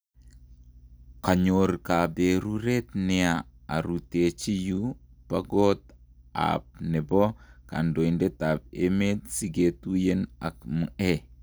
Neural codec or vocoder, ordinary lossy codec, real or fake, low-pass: none; none; real; none